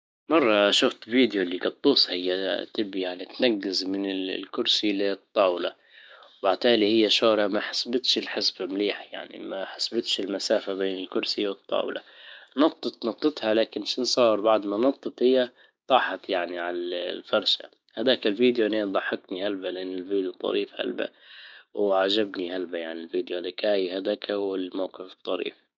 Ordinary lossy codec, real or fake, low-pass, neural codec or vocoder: none; fake; none; codec, 16 kHz, 6 kbps, DAC